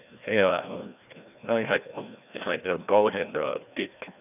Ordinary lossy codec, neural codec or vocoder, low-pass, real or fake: none; codec, 16 kHz, 1 kbps, FreqCodec, larger model; 3.6 kHz; fake